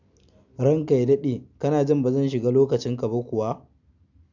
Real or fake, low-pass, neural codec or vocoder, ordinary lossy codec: real; 7.2 kHz; none; none